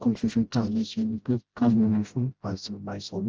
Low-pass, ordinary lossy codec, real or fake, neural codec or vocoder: 7.2 kHz; Opus, 24 kbps; fake; codec, 16 kHz, 0.5 kbps, FreqCodec, smaller model